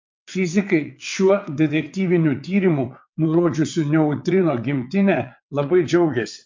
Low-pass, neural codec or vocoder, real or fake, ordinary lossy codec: 7.2 kHz; vocoder, 22.05 kHz, 80 mel bands, WaveNeXt; fake; MP3, 48 kbps